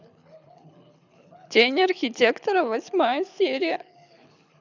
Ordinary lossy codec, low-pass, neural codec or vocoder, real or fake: none; 7.2 kHz; codec, 24 kHz, 6 kbps, HILCodec; fake